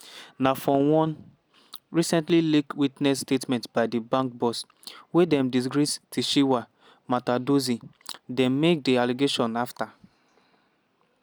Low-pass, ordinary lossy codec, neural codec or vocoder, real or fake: none; none; none; real